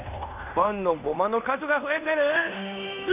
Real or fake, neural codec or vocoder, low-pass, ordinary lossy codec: fake; codec, 16 kHz in and 24 kHz out, 0.9 kbps, LongCat-Audio-Codec, fine tuned four codebook decoder; 3.6 kHz; none